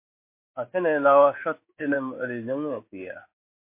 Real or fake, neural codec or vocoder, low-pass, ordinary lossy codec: fake; codec, 16 kHz in and 24 kHz out, 1 kbps, XY-Tokenizer; 3.6 kHz; MP3, 24 kbps